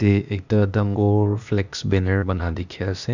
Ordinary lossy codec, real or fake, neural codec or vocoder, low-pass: none; fake; codec, 16 kHz, 0.8 kbps, ZipCodec; 7.2 kHz